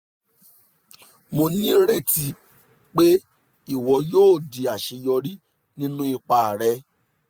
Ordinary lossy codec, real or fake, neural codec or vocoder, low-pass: none; real; none; none